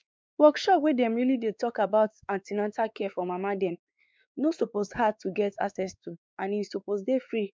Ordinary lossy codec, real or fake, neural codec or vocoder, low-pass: none; fake; codec, 16 kHz, 4 kbps, X-Codec, WavLM features, trained on Multilingual LibriSpeech; none